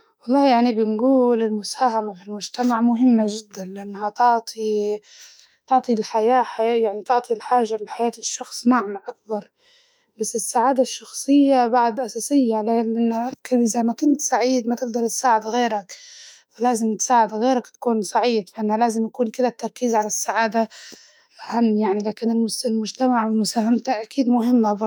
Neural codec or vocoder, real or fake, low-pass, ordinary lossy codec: autoencoder, 48 kHz, 32 numbers a frame, DAC-VAE, trained on Japanese speech; fake; none; none